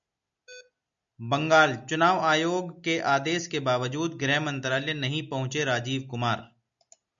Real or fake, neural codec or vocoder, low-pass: real; none; 7.2 kHz